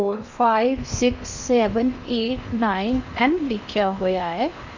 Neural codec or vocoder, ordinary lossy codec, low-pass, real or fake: codec, 16 kHz in and 24 kHz out, 0.8 kbps, FocalCodec, streaming, 65536 codes; none; 7.2 kHz; fake